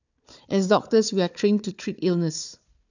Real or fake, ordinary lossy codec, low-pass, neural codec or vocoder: fake; none; 7.2 kHz; codec, 16 kHz, 4 kbps, FunCodec, trained on Chinese and English, 50 frames a second